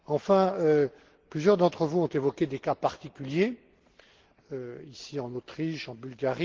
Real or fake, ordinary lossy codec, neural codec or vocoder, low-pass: real; Opus, 16 kbps; none; 7.2 kHz